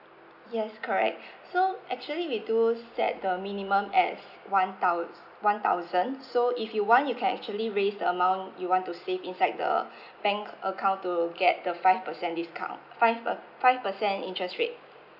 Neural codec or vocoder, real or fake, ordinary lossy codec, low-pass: none; real; none; 5.4 kHz